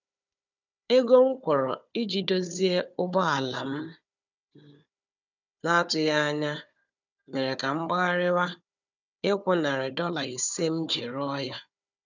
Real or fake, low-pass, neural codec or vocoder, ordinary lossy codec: fake; 7.2 kHz; codec, 16 kHz, 4 kbps, FunCodec, trained on Chinese and English, 50 frames a second; none